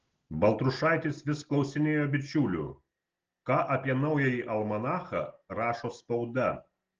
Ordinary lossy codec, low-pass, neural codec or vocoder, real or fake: Opus, 16 kbps; 7.2 kHz; none; real